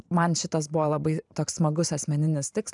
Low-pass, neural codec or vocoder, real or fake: 10.8 kHz; vocoder, 44.1 kHz, 128 mel bands every 512 samples, BigVGAN v2; fake